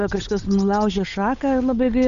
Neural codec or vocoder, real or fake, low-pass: codec, 16 kHz, 8 kbps, FunCodec, trained on Chinese and English, 25 frames a second; fake; 7.2 kHz